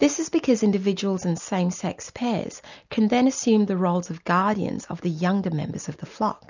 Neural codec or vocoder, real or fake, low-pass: none; real; 7.2 kHz